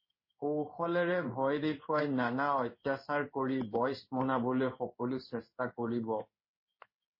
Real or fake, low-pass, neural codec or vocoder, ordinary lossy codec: fake; 5.4 kHz; codec, 16 kHz in and 24 kHz out, 1 kbps, XY-Tokenizer; MP3, 24 kbps